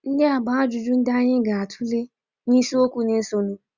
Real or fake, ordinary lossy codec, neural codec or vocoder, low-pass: real; Opus, 64 kbps; none; 7.2 kHz